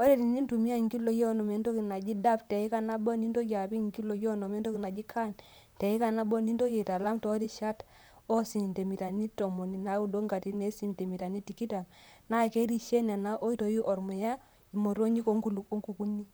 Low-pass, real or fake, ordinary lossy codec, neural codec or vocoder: none; fake; none; vocoder, 44.1 kHz, 128 mel bands, Pupu-Vocoder